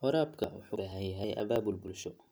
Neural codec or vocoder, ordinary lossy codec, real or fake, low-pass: none; none; real; none